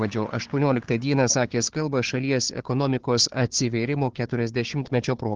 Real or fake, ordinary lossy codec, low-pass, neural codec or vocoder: fake; Opus, 16 kbps; 7.2 kHz; codec, 16 kHz, 4 kbps, FunCodec, trained on Chinese and English, 50 frames a second